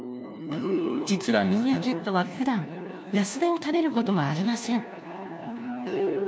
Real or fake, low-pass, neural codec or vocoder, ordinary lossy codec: fake; none; codec, 16 kHz, 1 kbps, FunCodec, trained on LibriTTS, 50 frames a second; none